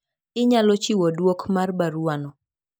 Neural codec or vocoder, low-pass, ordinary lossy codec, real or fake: none; none; none; real